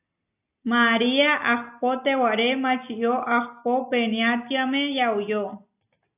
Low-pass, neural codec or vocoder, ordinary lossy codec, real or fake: 3.6 kHz; none; AAC, 32 kbps; real